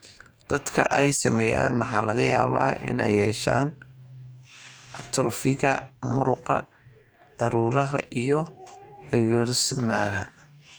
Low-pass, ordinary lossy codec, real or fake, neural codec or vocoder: none; none; fake; codec, 44.1 kHz, 2.6 kbps, DAC